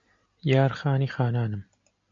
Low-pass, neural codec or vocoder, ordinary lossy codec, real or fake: 7.2 kHz; none; MP3, 48 kbps; real